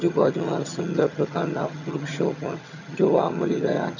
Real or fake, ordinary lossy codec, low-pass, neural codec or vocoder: fake; none; 7.2 kHz; vocoder, 22.05 kHz, 80 mel bands, HiFi-GAN